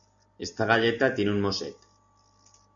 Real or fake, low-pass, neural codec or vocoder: real; 7.2 kHz; none